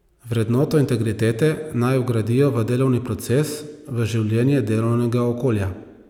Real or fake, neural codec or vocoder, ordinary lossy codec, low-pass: real; none; none; 19.8 kHz